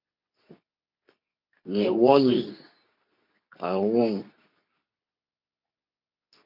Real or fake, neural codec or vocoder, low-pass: fake; codec, 24 kHz, 0.9 kbps, WavTokenizer, medium speech release version 2; 5.4 kHz